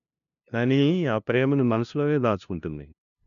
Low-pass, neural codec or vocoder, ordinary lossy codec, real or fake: 7.2 kHz; codec, 16 kHz, 0.5 kbps, FunCodec, trained on LibriTTS, 25 frames a second; none; fake